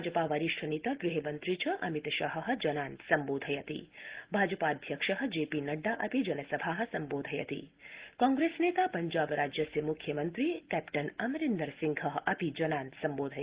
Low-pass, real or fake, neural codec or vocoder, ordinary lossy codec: 3.6 kHz; real; none; Opus, 16 kbps